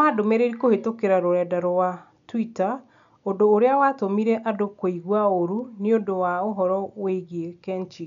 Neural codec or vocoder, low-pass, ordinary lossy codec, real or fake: none; 7.2 kHz; none; real